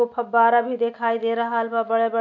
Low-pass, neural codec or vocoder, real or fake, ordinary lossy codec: 7.2 kHz; none; real; none